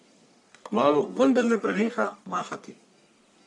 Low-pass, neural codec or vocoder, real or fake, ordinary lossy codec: 10.8 kHz; codec, 44.1 kHz, 1.7 kbps, Pupu-Codec; fake; none